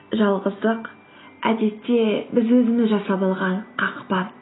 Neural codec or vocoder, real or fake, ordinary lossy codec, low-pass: none; real; AAC, 16 kbps; 7.2 kHz